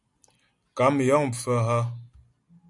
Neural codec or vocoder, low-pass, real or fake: none; 10.8 kHz; real